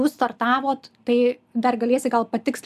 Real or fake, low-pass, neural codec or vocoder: real; 14.4 kHz; none